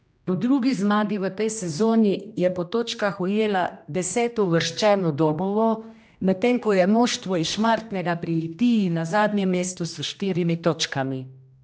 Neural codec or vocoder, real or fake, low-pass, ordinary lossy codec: codec, 16 kHz, 1 kbps, X-Codec, HuBERT features, trained on general audio; fake; none; none